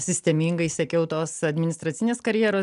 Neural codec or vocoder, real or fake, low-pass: none; real; 10.8 kHz